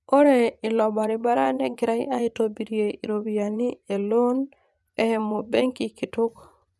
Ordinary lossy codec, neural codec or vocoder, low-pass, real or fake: none; vocoder, 24 kHz, 100 mel bands, Vocos; none; fake